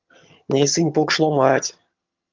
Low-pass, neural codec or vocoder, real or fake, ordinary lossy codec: 7.2 kHz; vocoder, 22.05 kHz, 80 mel bands, HiFi-GAN; fake; Opus, 32 kbps